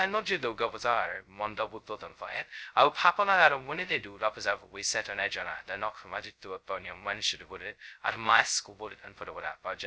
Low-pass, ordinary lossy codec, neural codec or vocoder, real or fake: none; none; codec, 16 kHz, 0.2 kbps, FocalCodec; fake